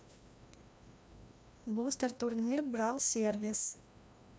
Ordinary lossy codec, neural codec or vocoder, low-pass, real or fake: none; codec, 16 kHz, 1 kbps, FreqCodec, larger model; none; fake